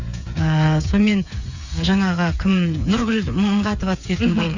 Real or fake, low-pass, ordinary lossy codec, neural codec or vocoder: fake; 7.2 kHz; Opus, 64 kbps; codec, 16 kHz, 8 kbps, FreqCodec, smaller model